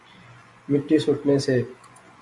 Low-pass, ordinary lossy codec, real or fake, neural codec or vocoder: 10.8 kHz; MP3, 64 kbps; fake; vocoder, 24 kHz, 100 mel bands, Vocos